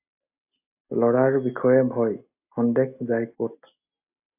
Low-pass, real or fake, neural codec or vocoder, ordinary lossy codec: 3.6 kHz; real; none; AAC, 32 kbps